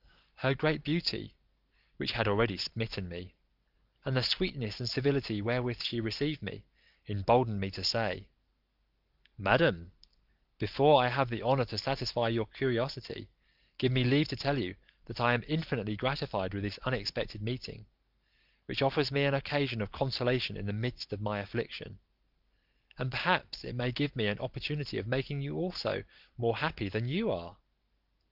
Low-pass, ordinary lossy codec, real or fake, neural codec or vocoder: 5.4 kHz; Opus, 24 kbps; real; none